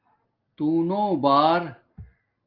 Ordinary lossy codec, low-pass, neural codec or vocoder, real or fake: Opus, 24 kbps; 5.4 kHz; none; real